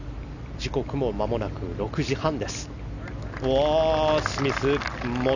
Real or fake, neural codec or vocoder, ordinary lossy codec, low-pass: real; none; none; 7.2 kHz